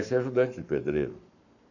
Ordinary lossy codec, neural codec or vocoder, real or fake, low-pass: none; codec, 44.1 kHz, 7.8 kbps, Pupu-Codec; fake; 7.2 kHz